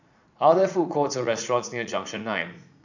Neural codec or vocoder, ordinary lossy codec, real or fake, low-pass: vocoder, 44.1 kHz, 80 mel bands, Vocos; none; fake; 7.2 kHz